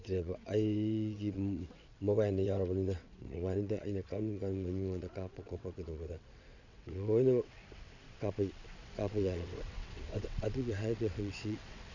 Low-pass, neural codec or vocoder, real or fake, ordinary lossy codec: 7.2 kHz; vocoder, 22.05 kHz, 80 mel bands, WaveNeXt; fake; none